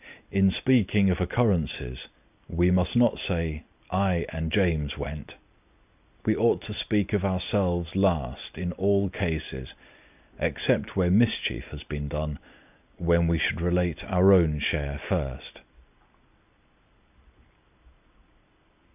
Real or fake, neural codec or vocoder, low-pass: real; none; 3.6 kHz